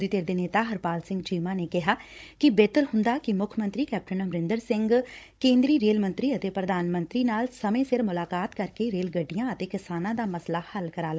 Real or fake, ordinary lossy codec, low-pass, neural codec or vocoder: fake; none; none; codec, 16 kHz, 16 kbps, FunCodec, trained on Chinese and English, 50 frames a second